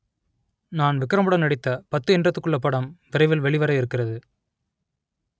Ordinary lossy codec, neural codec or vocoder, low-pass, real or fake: none; none; none; real